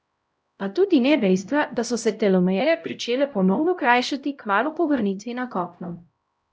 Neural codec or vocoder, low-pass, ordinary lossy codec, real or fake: codec, 16 kHz, 0.5 kbps, X-Codec, HuBERT features, trained on LibriSpeech; none; none; fake